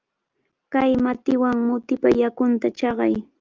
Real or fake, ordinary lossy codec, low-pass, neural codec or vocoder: real; Opus, 24 kbps; 7.2 kHz; none